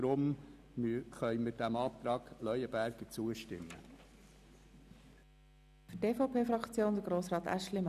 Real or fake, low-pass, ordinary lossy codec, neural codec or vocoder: real; 14.4 kHz; none; none